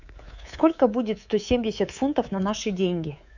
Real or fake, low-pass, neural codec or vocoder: fake; 7.2 kHz; codec, 24 kHz, 3.1 kbps, DualCodec